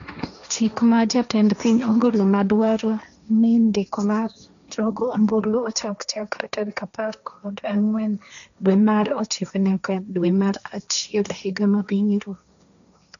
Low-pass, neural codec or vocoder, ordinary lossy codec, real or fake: 7.2 kHz; codec, 16 kHz, 1.1 kbps, Voila-Tokenizer; none; fake